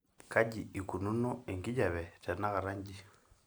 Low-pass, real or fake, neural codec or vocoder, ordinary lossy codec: none; real; none; none